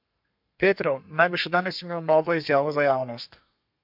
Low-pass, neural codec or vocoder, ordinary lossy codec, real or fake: 5.4 kHz; codec, 32 kHz, 1.9 kbps, SNAC; MP3, 48 kbps; fake